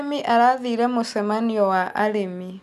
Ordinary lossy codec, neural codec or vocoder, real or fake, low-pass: none; none; real; 14.4 kHz